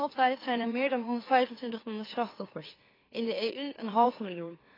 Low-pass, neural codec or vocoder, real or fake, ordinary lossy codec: 5.4 kHz; autoencoder, 44.1 kHz, a latent of 192 numbers a frame, MeloTTS; fake; AAC, 24 kbps